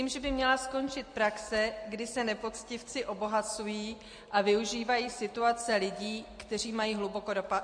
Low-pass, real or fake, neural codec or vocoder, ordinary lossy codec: 9.9 kHz; real; none; MP3, 48 kbps